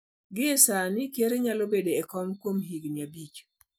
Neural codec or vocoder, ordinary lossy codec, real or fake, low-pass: none; none; real; none